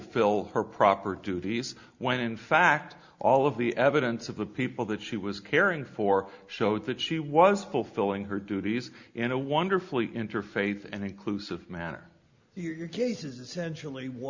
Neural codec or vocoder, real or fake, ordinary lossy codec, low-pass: none; real; Opus, 64 kbps; 7.2 kHz